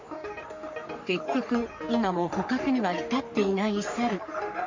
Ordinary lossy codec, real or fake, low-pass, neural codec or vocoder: MP3, 48 kbps; fake; 7.2 kHz; codec, 44.1 kHz, 3.4 kbps, Pupu-Codec